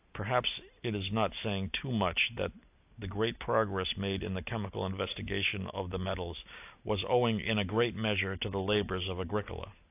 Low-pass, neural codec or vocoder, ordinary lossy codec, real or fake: 3.6 kHz; none; AAC, 32 kbps; real